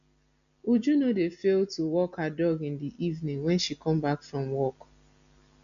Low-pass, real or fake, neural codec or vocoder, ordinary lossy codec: 7.2 kHz; real; none; none